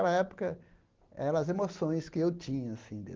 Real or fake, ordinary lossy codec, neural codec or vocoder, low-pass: real; Opus, 16 kbps; none; 7.2 kHz